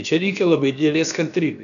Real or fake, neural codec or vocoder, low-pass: fake; codec, 16 kHz, about 1 kbps, DyCAST, with the encoder's durations; 7.2 kHz